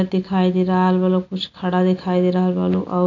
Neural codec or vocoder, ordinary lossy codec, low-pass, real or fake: none; none; 7.2 kHz; real